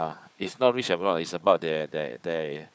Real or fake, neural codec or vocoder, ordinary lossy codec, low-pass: fake; codec, 16 kHz, 4 kbps, FunCodec, trained on Chinese and English, 50 frames a second; none; none